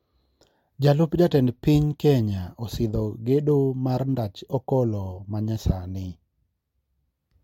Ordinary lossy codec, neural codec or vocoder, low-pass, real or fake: MP3, 64 kbps; none; 19.8 kHz; real